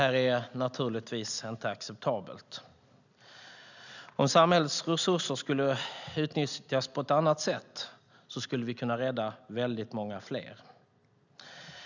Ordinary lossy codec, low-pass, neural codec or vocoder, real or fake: none; 7.2 kHz; none; real